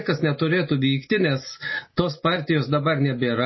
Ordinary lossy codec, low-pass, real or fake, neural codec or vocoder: MP3, 24 kbps; 7.2 kHz; real; none